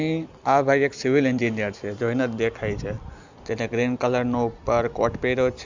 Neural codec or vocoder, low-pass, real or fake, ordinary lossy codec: none; 7.2 kHz; real; Opus, 64 kbps